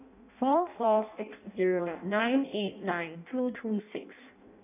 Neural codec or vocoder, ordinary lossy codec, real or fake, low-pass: codec, 16 kHz in and 24 kHz out, 0.6 kbps, FireRedTTS-2 codec; none; fake; 3.6 kHz